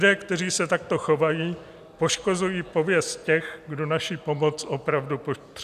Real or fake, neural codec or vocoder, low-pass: real; none; 14.4 kHz